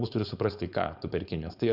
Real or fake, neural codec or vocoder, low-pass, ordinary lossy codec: fake; codec, 16 kHz, 4.8 kbps, FACodec; 5.4 kHz; Opus, 64 kbps